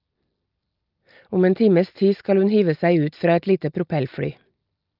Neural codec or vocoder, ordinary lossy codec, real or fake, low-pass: none; Opus, 24 kbps; real; 5.4 kHz